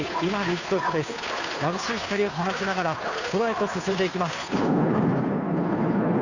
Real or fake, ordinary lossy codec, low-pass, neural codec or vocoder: fake; none; 7.2 kHz; codec, 16 kHz, 2 kbps, FunCodec, trained on Chinese and English, 25 frames a second